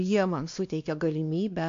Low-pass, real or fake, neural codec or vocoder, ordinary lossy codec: 7.2 kHz; fake; codec, 16 kHz, 2 kbps, X-Codec, WavLM features, trained on Multilingual LibriSpeech; AAC, 48 kbps